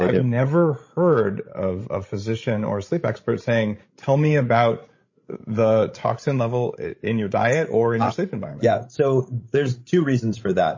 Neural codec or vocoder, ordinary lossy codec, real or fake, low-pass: codec, 16 kHz, 16 kbps, FreqCodec, larger model; MP3, 32 kbps; fake; 7.2 kHz